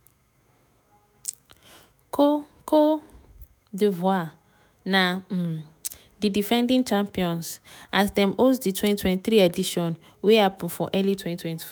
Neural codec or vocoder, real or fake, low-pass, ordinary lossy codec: autoencoder, 48 kHz, 128 numbers a frame, DAC-VAE, trained on Japanese speech; fake; none; none